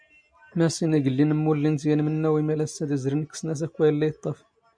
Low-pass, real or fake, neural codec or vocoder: 9.9 kHz; real; none